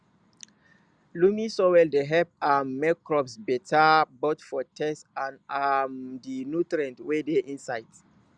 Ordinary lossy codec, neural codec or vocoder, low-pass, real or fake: Opus, 64 kbps; none; 9.9 kHz; real